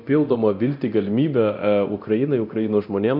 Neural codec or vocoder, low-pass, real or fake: codec, 24 kHz, 0.9 kbps, DualCodec; 5.4 kHz; fake